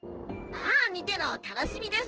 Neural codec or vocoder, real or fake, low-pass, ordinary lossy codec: codec, 16 kHz in and 24 kHz out, 1 kbps, XY-Tokenizer; fake; 7.2 kHz; Opus, 16 kbps